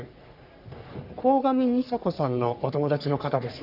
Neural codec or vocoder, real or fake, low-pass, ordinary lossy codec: codec, 44.1 kHz, 3.4 kbps, Pupu-Codec; fake; 5.4 kHz; none